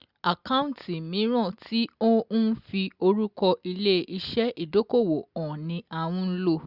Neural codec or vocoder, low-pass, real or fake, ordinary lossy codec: none; 5.4 kHz; real; Opus, 64 kbps